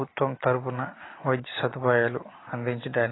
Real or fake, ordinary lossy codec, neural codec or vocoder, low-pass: real; AAC, 16 kbps; none; 7.2 kHz